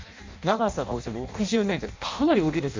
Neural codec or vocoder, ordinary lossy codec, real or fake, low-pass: codec, 16 kHz in and 24 kHz out, 0.6 kbps, FireRedTTS-2 codec; MP3, 64 kbps; fake; 7.2 kHz